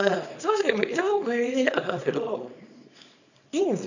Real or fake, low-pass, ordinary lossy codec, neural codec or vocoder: fake; 7.2 kHz; none; codec, 24 kHz, 0.9 kbps, WavTokenizer, small release